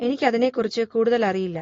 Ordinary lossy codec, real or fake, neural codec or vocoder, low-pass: AAC, 32 kbps; real; none; 7.2 kHz